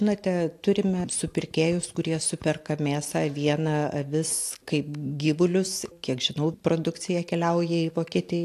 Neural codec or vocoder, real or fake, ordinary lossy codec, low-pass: none; real; AAC, 64 kbps; 14.4 kHz